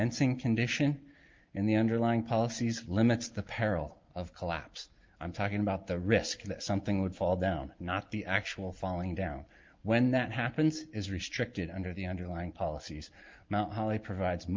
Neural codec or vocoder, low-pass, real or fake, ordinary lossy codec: none; 7.2 kHz; real; Opus, 32 kbps